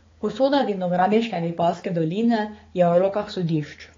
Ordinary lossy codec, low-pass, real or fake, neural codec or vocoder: AAC, 32 kbps; 7.2 kHz; fake; codec, 16 kHz, 4 kbps, X-Codec, HuBERT features, trained on balanced general audio